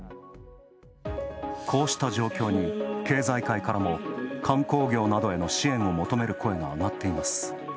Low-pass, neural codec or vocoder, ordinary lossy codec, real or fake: none; none; none; real